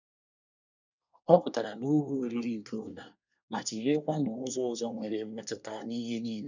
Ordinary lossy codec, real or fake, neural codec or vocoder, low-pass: none; fake; codec, 24 kHz, 1 kbps, SNAC; 7.2 kHz